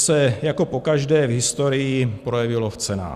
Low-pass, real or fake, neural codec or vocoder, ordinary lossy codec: 14.4 kHz; real; none; Opus, 64 kbps